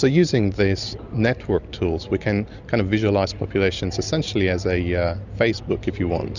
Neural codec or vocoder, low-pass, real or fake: none; 7.2 kHz; real